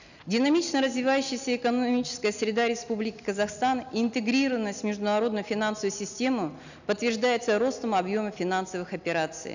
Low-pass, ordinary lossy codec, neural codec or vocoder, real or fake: 7.2 kHz; none; none; real